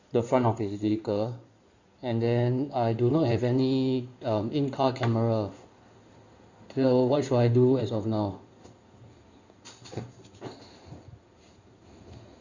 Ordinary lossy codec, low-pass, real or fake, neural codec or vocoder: Opus, 64 kbps; 7.2 kHz; fake; codec, 16 kHz in and 24 kHz out, 2.2 kbps, FireRedTTS-2 codec